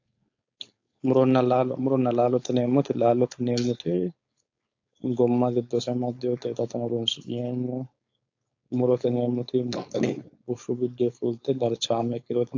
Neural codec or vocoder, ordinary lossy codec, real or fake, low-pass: codec, 16 kHz, 4.8 kbps, FACodec; AAC, 48 kbps; fake; 7.2 kHz